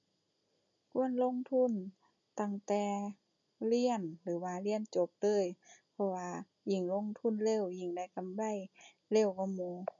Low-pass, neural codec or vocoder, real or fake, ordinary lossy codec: 7.2 kHz; none; real; none